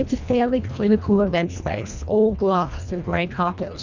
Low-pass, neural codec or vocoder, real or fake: 7.2 kHz; codec, 24 kHz, 1.5 kbps, HILCodec; fake